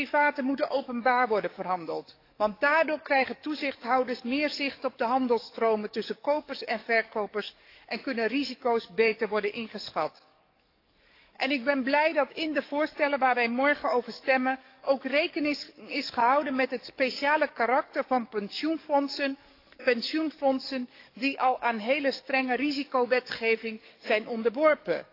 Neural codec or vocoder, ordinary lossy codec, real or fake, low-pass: codec, 44.1 kHz, 7.8 kbps, DAC; AAC, 32 kbps; fake; 5.4 kHz